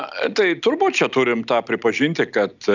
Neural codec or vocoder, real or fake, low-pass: none; real; 7.2 kHz